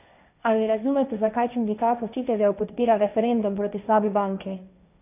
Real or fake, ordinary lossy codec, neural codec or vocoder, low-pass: fake; none; codec, 16 kHz, 1.1 kbps, Voila-Tokenizer; 3.6 kHz